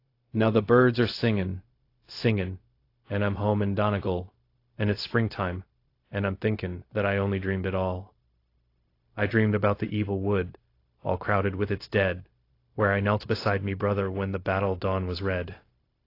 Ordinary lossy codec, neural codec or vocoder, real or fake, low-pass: AAC, 32 kbps; codec, 16 kHz, 0.4 kbps, LongCat-Audio-Codec; fake; 5.4 kHz